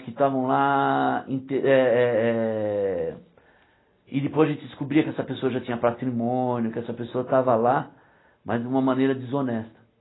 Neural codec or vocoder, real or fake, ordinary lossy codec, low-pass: none; real; AAC, 16 kbps; 7.2 kHz